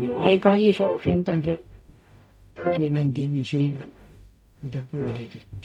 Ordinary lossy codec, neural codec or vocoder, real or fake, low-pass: none; codec, 44.1 kHz, 0.9 kbps, DAC; fake; 19.8 kHz